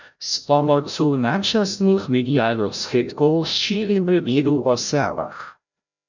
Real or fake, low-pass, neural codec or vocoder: fake; 7.2 kHz; codec, 16 kHz, 0.5 kbps, FreqCodec, larger model